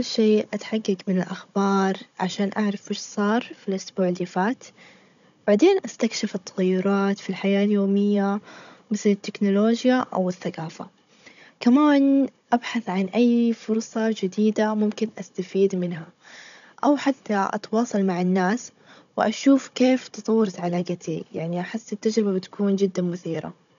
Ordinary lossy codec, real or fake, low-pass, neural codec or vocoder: none; fake; 7.2 kHz; codec, 16 kHz, 16 kbps, FunCodec, trained on Chinese and English, 50 frames a second